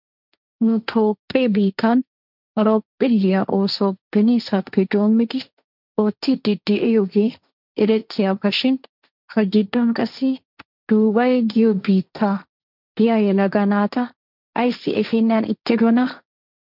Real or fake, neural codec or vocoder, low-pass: fake; codec, 16 kHz, 1.1 kbps, Voila-Tokenizer; 5.4 kHz